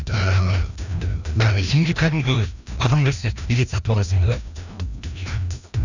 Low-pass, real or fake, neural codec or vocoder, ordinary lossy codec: 7.2 kHz; fake; codec, 16 kHz, 1 kbps, FreqCodec, larger model; none